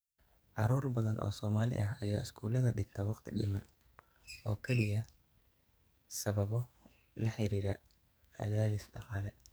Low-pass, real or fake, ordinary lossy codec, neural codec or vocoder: none; fake; none; codec, 44.1 kHz, 2.6 kbps, SNAC